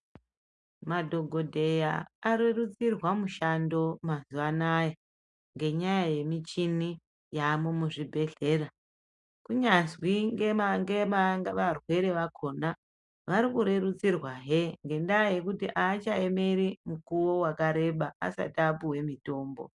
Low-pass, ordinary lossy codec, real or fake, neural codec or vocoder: 10.8 kHz; MP3, 96 kbps; real; none